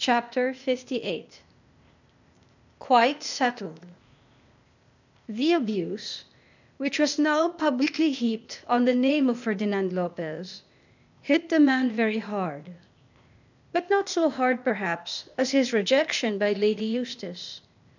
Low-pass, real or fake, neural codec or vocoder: 7.2 kHz; fake; codec, 16 kHz, 0.8 kbps, ZipCodec